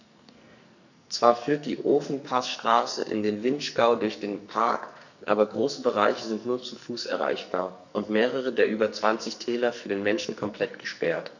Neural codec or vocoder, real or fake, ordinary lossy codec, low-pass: codec, 44.1 kHz, 2.6 kbps, SNAC; fake; none; 7.2 kHz